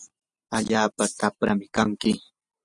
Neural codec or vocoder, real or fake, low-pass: none; real; 10.8 kHz